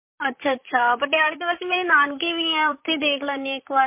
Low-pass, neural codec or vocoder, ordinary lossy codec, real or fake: 3.6 kHz; vocoder, 44.1 kHz, 128 mel bands, Pupu-Vocoder; MP3, 24 kbps; fake